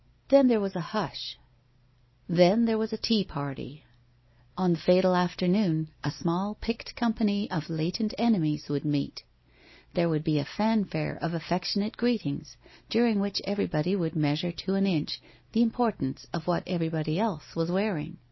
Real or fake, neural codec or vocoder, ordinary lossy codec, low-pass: real; none; MP3, 24 kbps; 7.2 kHz